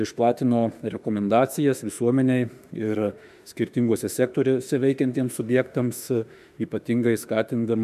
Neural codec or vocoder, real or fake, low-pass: autoencoder, 48 kHz, 32 numbers a frame, DAC-VAE, trained on Japanese speech; fake; 14.4 kHz